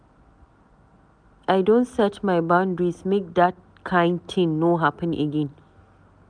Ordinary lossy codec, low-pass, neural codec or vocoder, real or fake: none; 9.9 kHz; none; real